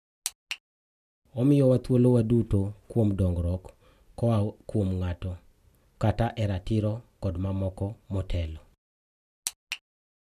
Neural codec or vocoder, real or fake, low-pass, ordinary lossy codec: none; real; 14.4 kHz; none